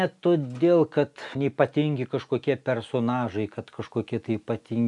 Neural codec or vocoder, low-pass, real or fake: none; 10.8 kHz; real